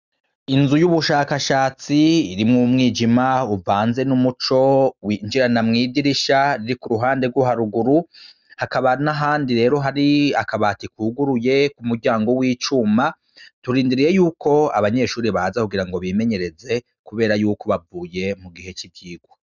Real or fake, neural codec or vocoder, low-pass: real; none; 7.2 kHz